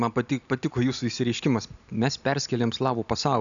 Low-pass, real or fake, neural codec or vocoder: 7.2 kHz; real; none